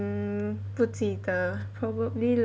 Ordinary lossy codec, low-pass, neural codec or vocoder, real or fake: none; none; none; real